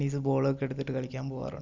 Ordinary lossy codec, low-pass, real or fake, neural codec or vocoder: none; 7.2 kHz; real; none